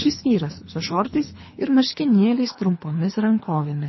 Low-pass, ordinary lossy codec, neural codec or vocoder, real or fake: 7.2 kHz; MP3, 24 kbps; codec, 24 kHz, 3 kbps, HILCodec; fake